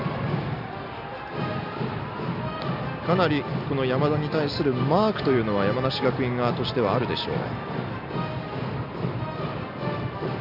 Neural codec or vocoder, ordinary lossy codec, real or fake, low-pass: none; none; real; 5.4 kHz